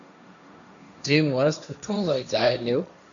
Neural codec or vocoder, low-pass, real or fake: codec, 16 kHz, 1.1 kbps, Voila-Tokenizer; 7.2 kHz; fake